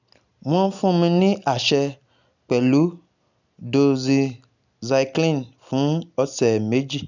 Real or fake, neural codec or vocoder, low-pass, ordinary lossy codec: real; none; 7.2 kHz; none